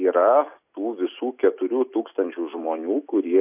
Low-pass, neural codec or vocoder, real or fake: 3.6 kHz; none; real